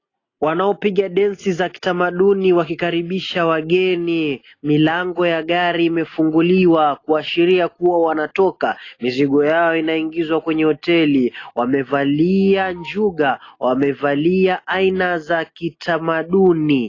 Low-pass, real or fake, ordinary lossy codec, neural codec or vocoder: 7.2 kHz; real; AAC, 32 kbps; none